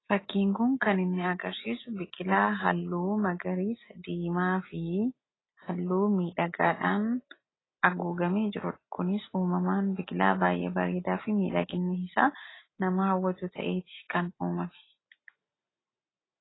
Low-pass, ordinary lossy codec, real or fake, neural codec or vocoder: 7.2 kHz; AAC, 16 kbps; real; none